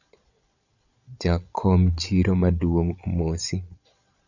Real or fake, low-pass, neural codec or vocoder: fake; 7.2 kHz; vocoder, 22.05 kHz, 80 mel bands, Vocos